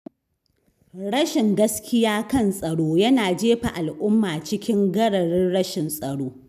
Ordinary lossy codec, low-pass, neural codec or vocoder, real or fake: none; 14.4 kHz; none; real